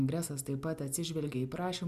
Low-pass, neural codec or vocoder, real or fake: 14.4 kHz; none; real